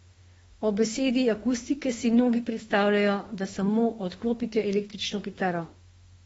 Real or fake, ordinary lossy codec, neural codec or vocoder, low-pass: fake; AAC, 24 kbps; autoencoder, 48 kHz, 32 numbers a frame, DAC-VAE, trained on Japanese speech; 19.8 kHz